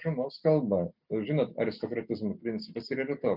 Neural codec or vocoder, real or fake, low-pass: none; real; 5.4 kHz